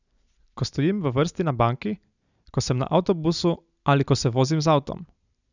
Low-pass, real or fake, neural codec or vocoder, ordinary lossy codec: 7.2 kHz; real; none; none